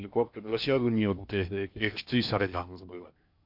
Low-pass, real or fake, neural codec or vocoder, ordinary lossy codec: 5.4 kHz; fake; codec, 16 kHz in and 24 kHz out, 0.8 kbps, FocalCodec, streaming, 65536 codes; MP3, 48 kbps